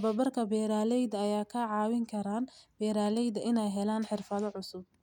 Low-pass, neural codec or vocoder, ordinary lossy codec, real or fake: 19.8 kHz; none; none; real